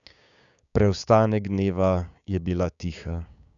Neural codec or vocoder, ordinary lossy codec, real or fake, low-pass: codec, 16 kHz, 6 kbps, DAC; none; fake; 7.2 kHz